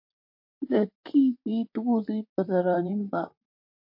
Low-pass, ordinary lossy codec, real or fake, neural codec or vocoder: 5.4 kHz; MP3, 32 kbps; fake; vocoder, 44.1 kHz, 128 mel bands, Pupu-Vocoder